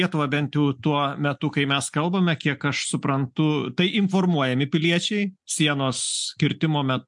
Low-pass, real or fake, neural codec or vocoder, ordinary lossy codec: 10.8 kHz; real; none; MP3, 64 kbps